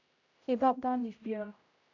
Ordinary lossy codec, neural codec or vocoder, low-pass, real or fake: none; codec, 16 kHz, 0.5 kbps, X-Codec, HuBERT features, trained on general audio; 7.2 kHz; fake